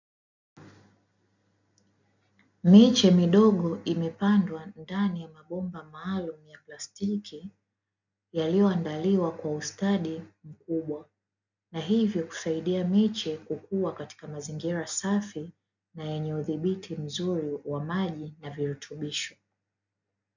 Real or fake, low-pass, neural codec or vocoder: real; 7.2 kHz; none